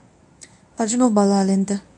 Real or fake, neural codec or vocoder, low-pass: fake; codec, 24 kHz, 0.9 kbps, WavTokenizer, medium speech release version 1; 10.8 kHz